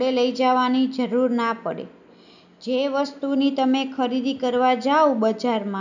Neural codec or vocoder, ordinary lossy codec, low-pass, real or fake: none; none; 7.2 kHz; real